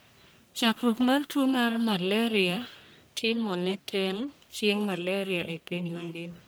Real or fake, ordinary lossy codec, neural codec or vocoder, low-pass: fake; none; codec, 44.1 kHz, 1.7 kbps, Pupu-Codec; none